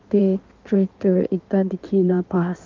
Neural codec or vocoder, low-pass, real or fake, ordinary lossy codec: codec, 16 kHz in and 24 kHz out, 1.1 kbps, FireRedTTS-2 codec; 7.2 kHz; fake; Opus, 32 kbps